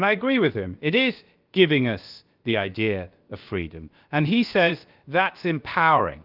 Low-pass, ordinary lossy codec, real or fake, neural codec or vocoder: 5.4 kHz; Opus, 24 kbps; fake; codec, 16 kHz, about 1 kbps, DyCAST, with the encoder's durations